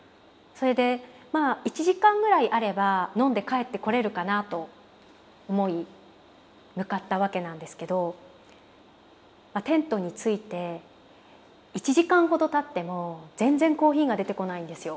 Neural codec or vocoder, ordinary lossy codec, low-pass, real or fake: none; none; none; real